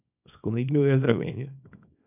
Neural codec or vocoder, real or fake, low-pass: codec, 24 kHz, 0.9 kbps, WavTokenizer, small release; fake; 3.6 kHz